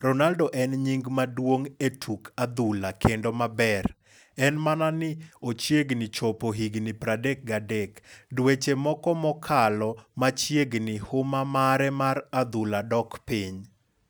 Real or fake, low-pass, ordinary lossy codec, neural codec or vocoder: real; none; none; none